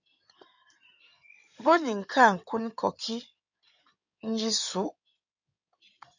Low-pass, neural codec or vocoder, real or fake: 7.2 kHz; codec, 16 kHz in and 24 kHz out, 2.2 kbps, FireRedTTS-2 codec; fake